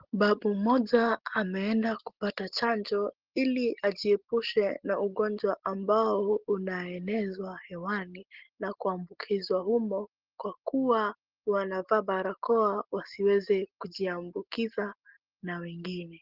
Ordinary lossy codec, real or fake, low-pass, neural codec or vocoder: Opus, 16 kbps; real; 5.4 kHz; none